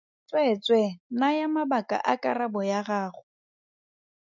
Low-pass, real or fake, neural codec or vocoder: 7.2 kHz; real; none